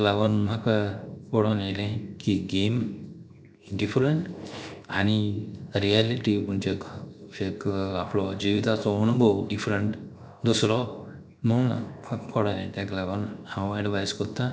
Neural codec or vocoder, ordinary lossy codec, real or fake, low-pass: codec, 16 kHz, 0.7 kbps, FocalCodec; none; fake; none